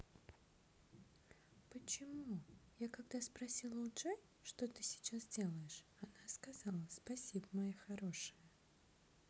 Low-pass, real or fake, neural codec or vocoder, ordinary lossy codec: none; real; none; none